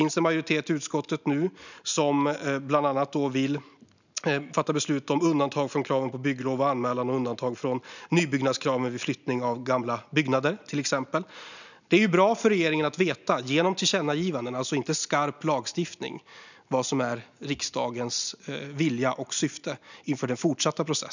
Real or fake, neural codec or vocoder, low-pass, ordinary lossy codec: real; none; 7.2 kHz; none